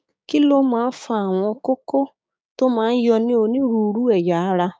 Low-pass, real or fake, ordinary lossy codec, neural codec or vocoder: none; fake; none; codec, 16 kHz, 6 kbps, DAC